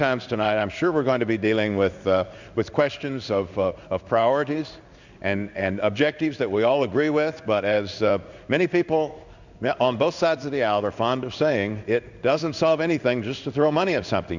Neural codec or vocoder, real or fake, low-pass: codec, 16 kHz in and 24 kHz out, 1 kbps, XY-Tokenizer; fake; 7.2 kHz